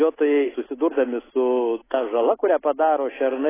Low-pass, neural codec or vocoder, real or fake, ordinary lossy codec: 3.6 kHz; none; real; AAC, 16 kbps